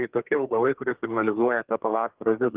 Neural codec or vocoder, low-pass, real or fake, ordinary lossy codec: codec, 32 kHz, 1.9 kbps, SNAC; 3.6 kHz; fake; Opus, 16 kbps